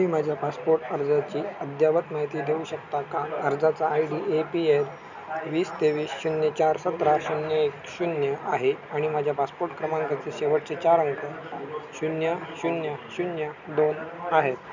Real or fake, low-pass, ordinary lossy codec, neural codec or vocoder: real; 7.2 kHz; none; none